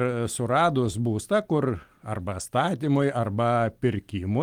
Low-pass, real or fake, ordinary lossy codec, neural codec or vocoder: 19.8 kHz; real; Opus, 24 kbps; none